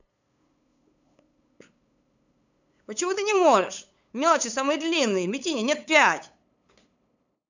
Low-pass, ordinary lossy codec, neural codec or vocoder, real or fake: 7.2 kHz; none; codec, 16 kHz, 8 kbps, FunCodec, trained on LibriTTS, 25 frames a second; fake